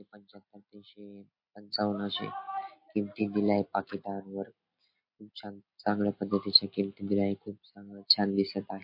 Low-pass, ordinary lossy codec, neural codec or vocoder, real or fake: 5.4 kHz; MP3, 32 kbps; none; real